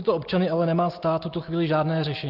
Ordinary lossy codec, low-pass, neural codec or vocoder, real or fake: Opus, 32 kbps; 5.4 kHz; none; real